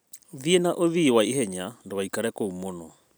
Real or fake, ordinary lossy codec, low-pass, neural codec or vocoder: real; none; none; none